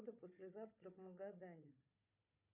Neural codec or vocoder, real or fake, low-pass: codec, 16 kHz, 4 kbps, FunCodec, trained on Chinese and English, 50 frames a second; fake; 3.6 kHz